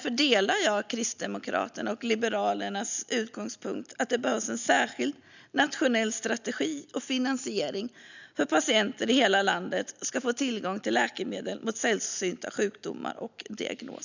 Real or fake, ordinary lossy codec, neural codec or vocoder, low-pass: real; none; none; 7.2 kHz